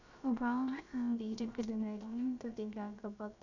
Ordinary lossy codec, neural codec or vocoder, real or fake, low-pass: none; codec, 16 kHz, about 1 kbps, DyCAST, with the encoder's durations; fake; 7.2 kHz